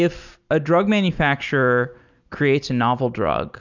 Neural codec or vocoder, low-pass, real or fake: none; 7.2 kHz; real